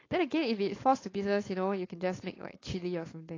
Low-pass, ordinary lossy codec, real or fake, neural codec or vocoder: 7.2 kHz; AAC, 32 kbps; fake; codec, 16 kHz, 4.8 kbps, FACodec